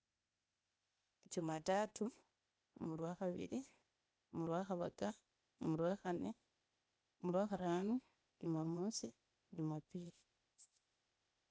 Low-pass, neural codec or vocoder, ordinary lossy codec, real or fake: none; codec, 16 kHz, 0.8 kbps, ZipCodec; none; fake